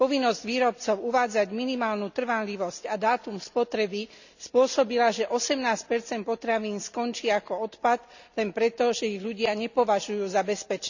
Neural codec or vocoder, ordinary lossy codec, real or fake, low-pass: none; none; real; 7.2 kHz